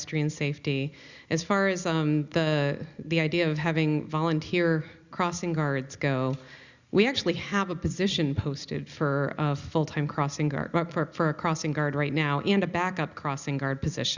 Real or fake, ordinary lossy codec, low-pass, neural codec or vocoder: real; Opus, 64 kbps; 7.2 kHz; none